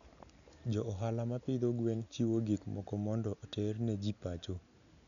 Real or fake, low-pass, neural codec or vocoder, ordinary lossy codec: real; 7.2 kHz; none; none